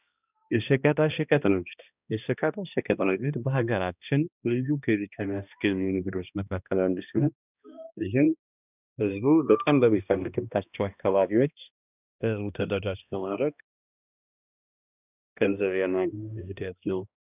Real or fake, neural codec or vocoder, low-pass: fake; codec, 16 kHz, 1 kbps, X-Codec, HuBERT features, trained on balanced general audio; 3.6 kHz